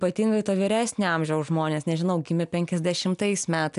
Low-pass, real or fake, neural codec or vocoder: 10.8 kHz; real; none